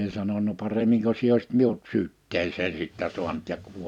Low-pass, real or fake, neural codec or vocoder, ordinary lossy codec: 19.8 kHz; fake; vocoder, 44.1 kHz, 128 mel bands every 256 samples, BigVGAN v2; none